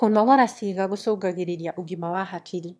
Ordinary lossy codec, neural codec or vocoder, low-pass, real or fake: none; autoencoder, 22.05 kHz, a latent of 192 numbers a frame, VITS, trained on one speaker; none; fake